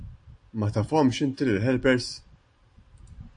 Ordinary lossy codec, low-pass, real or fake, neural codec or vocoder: AAC, 48 kbps; 9.9 kHz; real; none